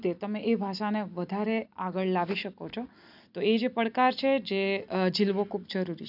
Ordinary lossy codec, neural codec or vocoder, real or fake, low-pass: none; none; real; 5.4 kHz